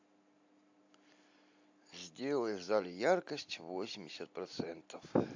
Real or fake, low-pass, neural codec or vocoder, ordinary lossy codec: real; 7.2 kHz; none; AAC, 48 kbps